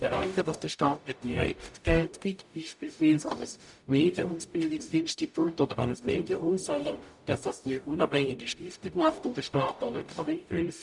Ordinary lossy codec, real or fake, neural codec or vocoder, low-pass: none; fake; codec, 44.1 kHz, 0.9 kbps, DAC; 10.8 kHz